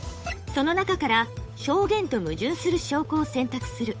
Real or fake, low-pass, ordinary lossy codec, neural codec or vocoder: fake; none; none; codec, 16 kHz, 8 kbps, FunCodec, trained on Chinese and English, 25 frames a second